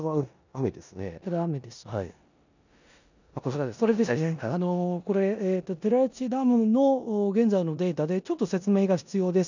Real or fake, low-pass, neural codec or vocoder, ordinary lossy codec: fake; 7.2 kHz; codec, 16 kHz in and 24 kHz out, 0.9 kbps, LongCat-Audio-Codec, four codebook decoder; none